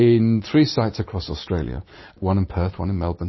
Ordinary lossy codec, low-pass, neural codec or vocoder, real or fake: MP3, 24 kbps; 7.2 kHz; none; real